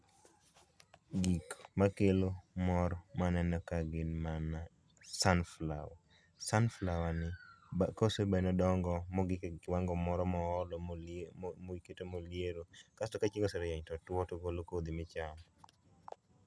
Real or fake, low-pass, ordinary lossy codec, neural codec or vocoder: real; none; none; none